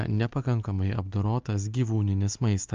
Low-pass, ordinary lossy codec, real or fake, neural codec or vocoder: 7.2 kHz; Opus, 24 kbps; real; none